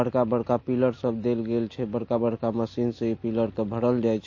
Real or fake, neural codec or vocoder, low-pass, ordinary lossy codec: real; none; 7.2 kHz; MP3, 32 kbps